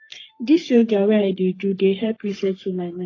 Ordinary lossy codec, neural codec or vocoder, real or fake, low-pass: AAC, 32 kbps; codec, 44.1 kHz, 3.4 kbps, Pupu-Codec; fake; 7.2 kHz